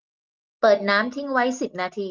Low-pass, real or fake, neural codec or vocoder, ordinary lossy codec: 7.2 kHz; real; none; Opus, 32 kbps